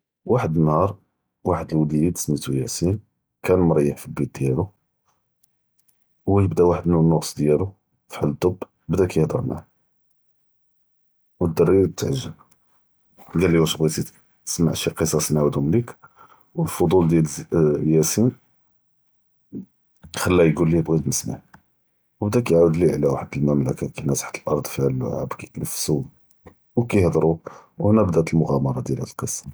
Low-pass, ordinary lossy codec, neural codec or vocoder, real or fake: none; none; none; real